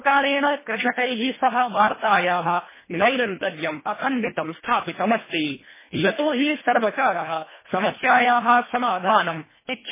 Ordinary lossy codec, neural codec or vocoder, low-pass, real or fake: MP3, 16 kbps; codec, 24 kHz, 1.5 kbps, HILCodec; 3.6 kHz; fake